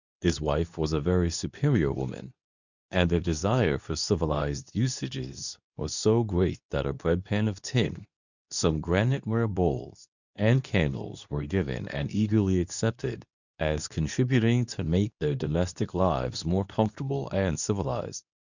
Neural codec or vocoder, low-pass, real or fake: codec, 24 kHz, 0.9 kbps, WavTokenizer, medium speech release version 2; 7.2 kHz; fake